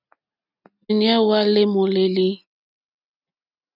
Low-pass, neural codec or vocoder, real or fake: 5.4 kHz; none; real